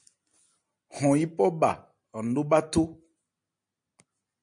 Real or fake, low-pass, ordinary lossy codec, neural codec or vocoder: real; 9.9 kHz; MP3, 64 kbps; none